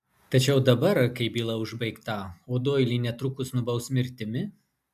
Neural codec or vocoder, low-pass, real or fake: none; 14.4 kHz; real